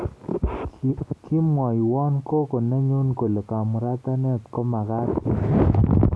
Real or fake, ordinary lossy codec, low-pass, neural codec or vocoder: real; none; none; none